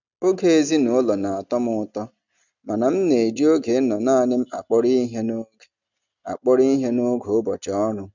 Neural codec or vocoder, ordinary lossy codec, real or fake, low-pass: none; none; real; 7.2 kHz